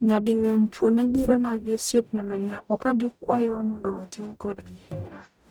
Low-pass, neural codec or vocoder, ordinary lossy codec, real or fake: none; codec, 44.1 kHz, 0.9 kbps, DAC; none; fake